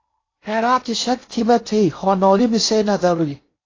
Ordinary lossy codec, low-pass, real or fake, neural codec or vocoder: AAC, 32 kbps; 7.2 kHz; fake; codec, 16 kHz in and 24 kHz out, 0.6 kbps, FocalCodec, streaming, 4096 codes